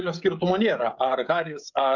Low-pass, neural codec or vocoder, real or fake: 7.2 kHz; codec, 16 kHz, 16 kbps, FreqCodec, smaller model; fake